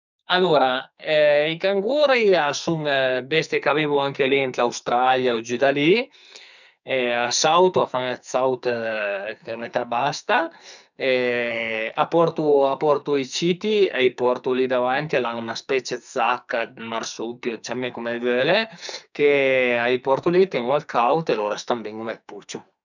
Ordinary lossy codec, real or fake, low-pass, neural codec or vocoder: none; fake; 7.2 kHz; codec, 44.1 kHz, 2.6 kbps, SNAC